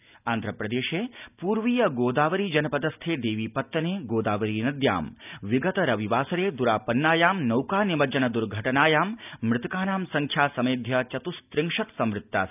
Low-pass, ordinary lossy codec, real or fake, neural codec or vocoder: 3.6 kHz; none; real; none